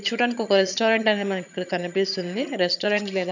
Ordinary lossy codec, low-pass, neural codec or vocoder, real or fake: none; 7.2 kHz; vocoder, 22.05 kHz, 80 mel bands, HiFi-GAN; fake